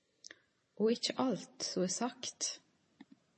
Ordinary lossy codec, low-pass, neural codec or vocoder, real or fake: MP3, 32 kbps; 10.8 kHz; none; real